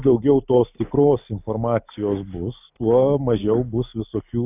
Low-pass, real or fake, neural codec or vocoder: 3.6 kHz; real; none